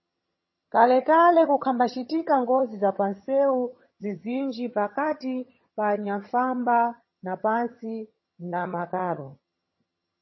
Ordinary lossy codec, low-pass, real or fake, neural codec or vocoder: MP3, 24 kbps; 7.2 kHz; fake; vocoder, 22.05 kHz, 80 mel bands, HiFi-GAN